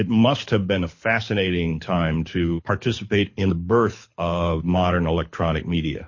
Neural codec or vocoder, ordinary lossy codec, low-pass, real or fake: codec, 24 kHz, 6 kbps, HILCodec; MP3, 32 kbps; 7.2 kHz; fake